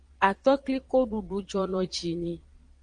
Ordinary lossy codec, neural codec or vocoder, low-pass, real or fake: Opus, 32 kbps; vocoder, 22.05 kHz, 80 mel bands, Vocos; 9.9 kHz; fake